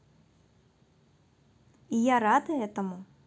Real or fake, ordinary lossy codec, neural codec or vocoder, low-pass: real; none; none; none